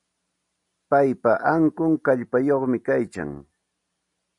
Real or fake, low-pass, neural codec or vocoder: real; 10.8 kHz; none